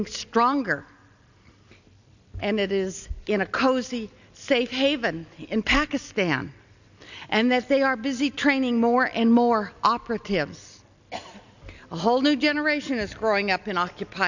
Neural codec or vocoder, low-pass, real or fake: none; 7.2 kHz; real